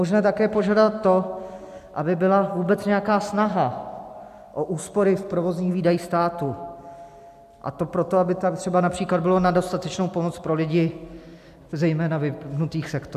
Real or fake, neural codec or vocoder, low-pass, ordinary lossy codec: real; none; 14.4 kHz; AAC, 96 kbps